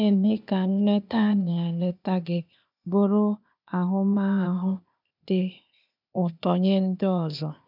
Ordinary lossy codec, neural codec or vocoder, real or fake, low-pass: none; codec, 16 kHz, 0.8 kbps, ZipCodec; fake; 5.4 kHz